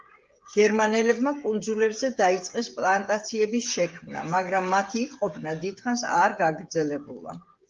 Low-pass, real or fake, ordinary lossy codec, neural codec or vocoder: 7.2 kHz; fake; Opus, 32 kbps; codec, 16 kHz, 8 kbps, FreqCodec, smaller model